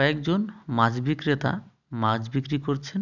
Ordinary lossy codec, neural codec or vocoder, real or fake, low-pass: none; none; real; 7.2 kHz